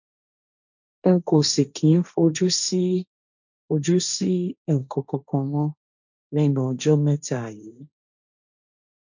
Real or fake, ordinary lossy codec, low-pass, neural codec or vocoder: fake; none; 7.2 kHz; codec, 16 kHz, 1.1 kbps, Voila-Tokenizer